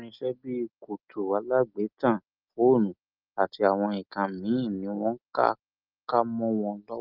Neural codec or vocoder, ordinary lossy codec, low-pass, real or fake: none; Opus, 32 kbps; 5.4 kHz; real